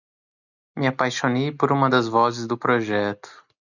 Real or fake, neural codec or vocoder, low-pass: real; none; 7.2 kHz